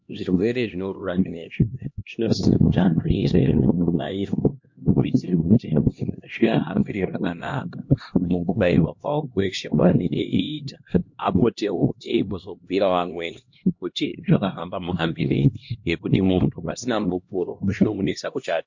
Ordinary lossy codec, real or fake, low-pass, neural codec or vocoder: MP3, 48 kbps; fake; 7.2 kHz; codec, 16 kHz, 1 kbps, X-Codec, HuBERT features, trained on LibriSpeech